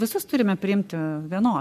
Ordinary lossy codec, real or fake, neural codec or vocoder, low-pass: MP3, 96 kbps; fake; codec, 44.1 kHz, 7.8 kbps, Pupu-Codec; 14.4 kHz